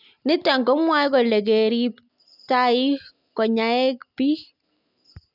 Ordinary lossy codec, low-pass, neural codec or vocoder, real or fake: none; 5.4 kHz; none; real